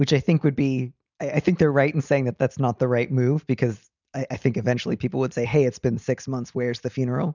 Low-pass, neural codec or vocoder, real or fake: 7.2 kHz; none; real